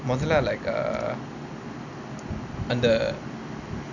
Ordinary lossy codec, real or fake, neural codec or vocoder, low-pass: none; real; none; 7.2 kHz